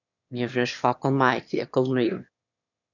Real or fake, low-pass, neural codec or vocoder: fake; 7.2 kHz; autoencoder, 22.05 kHz, a latent of 192 numbers a frame, VITS, trained on one speaker